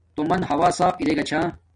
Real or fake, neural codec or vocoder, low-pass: real; none; 9.9 kHz